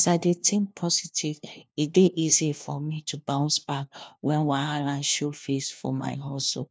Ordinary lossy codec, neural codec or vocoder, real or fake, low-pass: none; codec, 16 kHz, 1 kbps, FunCodec, trained on LibriTTS, 50 frames a second; fake; none